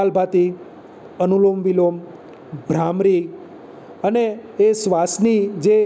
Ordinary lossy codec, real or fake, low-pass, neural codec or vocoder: none; real; none; none